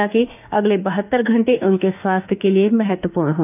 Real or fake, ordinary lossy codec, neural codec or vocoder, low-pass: fake; none; autoencoder, 48 kHz, 32 numbers a frame, DAC-VAE, trained on Japanese speech; 3.6 kHz